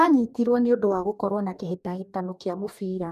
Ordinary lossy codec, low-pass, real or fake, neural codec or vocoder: none; 14.4 kHz; fake; codec, 44.1 kHz, 2.6 kbps, DAC